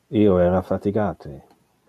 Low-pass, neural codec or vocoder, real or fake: 14.4 kHz; none; real